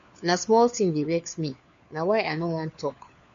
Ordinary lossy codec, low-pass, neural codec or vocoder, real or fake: MP3, 48 kbps; 7.2 kHz; codec, 16 kHz, 4 kbps, FunCodec, trained on LibriTTS, 50 frames a second; fake